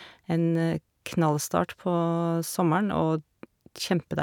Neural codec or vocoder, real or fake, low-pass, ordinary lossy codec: none; real; 19.8 kHz; none